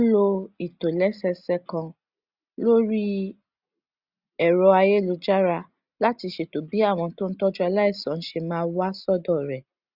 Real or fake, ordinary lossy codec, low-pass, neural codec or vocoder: real; Opus, 64 kbps; 5.4 kHz; none